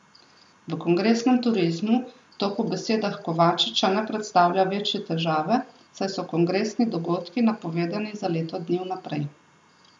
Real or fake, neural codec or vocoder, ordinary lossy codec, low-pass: real; none; none; 10.8 kHz